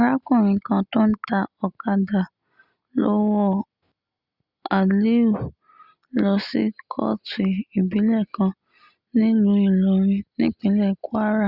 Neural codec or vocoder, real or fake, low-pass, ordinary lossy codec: none; real; 5.4 kHz; Opus, 64 kbps